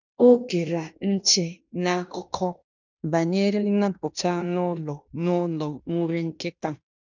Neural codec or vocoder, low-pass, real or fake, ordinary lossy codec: codec, 16 kHz in and 24 kHz out, 0.9 kbps, LongCat-Audio-Codec, fine tuned four codebook decoder; 7.2 kHz; fake; none